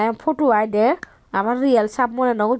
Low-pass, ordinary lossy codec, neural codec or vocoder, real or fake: none; none; none; real